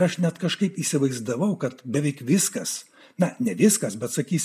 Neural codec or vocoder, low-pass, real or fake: none; 14.4 kHz; real